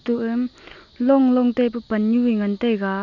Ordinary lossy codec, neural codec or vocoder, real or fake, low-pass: none; none; real; 7.2 kHz